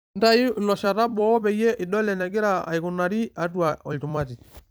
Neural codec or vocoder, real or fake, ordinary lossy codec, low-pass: vocoder, 44.1 kHz, 128 mel bands every 256 samples, BigVGAN v2; fake; none; none